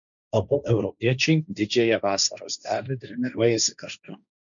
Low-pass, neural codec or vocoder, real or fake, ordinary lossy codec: 7.2 kHz; codec, 16 kHz, 1.1 kbps, Voila-Tokenizer; fake; MP3, 96 kbps